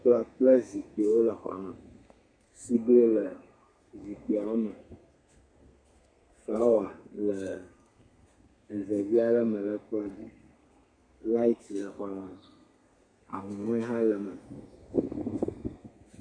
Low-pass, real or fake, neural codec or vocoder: 9.9 kHz; fake; codec, 32 kHz, 1.9 kbps, SNAC